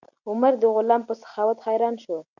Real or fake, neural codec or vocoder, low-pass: real; none; 7.2 kHz